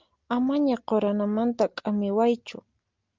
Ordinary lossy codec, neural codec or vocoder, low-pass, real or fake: Opus, 32 kbps; none; 7.2 kHz; real